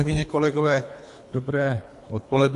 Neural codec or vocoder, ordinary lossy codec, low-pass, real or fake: codec, 24 kHz, 3 kbps, HILCodec; AAC, 64 kbps; 10.8 kHz; fake